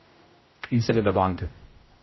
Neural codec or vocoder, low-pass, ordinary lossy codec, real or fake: codec, 16 kHz, 0.5 kbps, X-Codec, HuBERT features, trained on general audio; 7.2 kHz; MP3, 24 kbps; fake